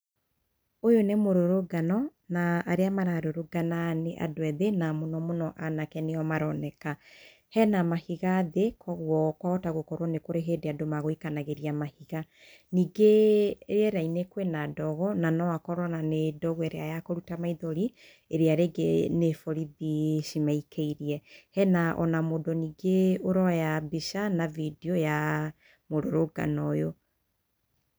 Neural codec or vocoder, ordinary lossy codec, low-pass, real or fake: none; none; none; real